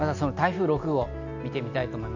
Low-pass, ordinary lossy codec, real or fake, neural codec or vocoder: 7.2 kHz; none; real; none